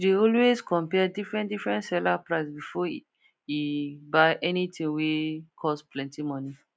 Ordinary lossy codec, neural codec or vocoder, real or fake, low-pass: none; none; real; none